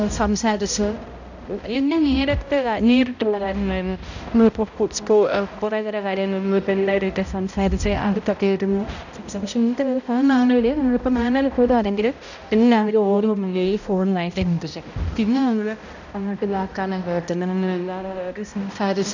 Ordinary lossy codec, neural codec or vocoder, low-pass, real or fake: none; codec, 16 kHz, 0.5 kbps, X-Codec, HuBERT features, trained on balanced general audio; 7.2 kHz; fake